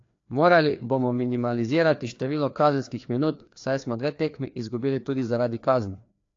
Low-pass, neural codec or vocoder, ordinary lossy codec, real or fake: 7.2 kHz; codec, 16 kHz, 2 kbps, FreqCodec, larger model; AAC, 48 kbps; fake